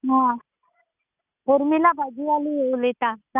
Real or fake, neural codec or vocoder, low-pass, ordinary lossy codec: real; none; 3.6 kHz; none